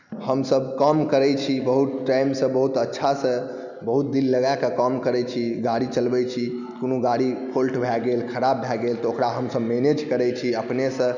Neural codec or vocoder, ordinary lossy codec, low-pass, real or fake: none; none; 7.2 kHz; real